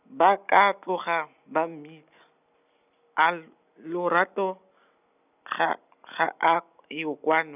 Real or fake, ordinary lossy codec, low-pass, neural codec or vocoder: real; none; 3.6 kHz; none